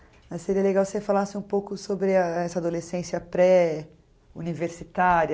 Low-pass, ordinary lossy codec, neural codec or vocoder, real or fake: none; none; none; real